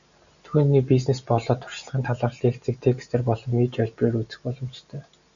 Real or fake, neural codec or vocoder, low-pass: real; none; 7.2 kHz